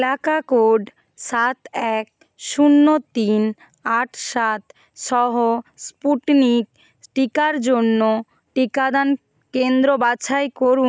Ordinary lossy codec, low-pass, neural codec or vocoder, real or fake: none; none; none; real